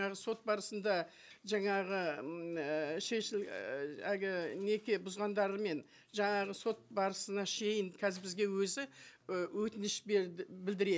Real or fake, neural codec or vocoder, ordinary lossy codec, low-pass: real; none; none; none